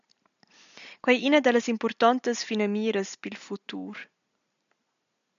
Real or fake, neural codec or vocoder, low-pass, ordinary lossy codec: real; none; 7.2 kHz; MP3, 96 kbps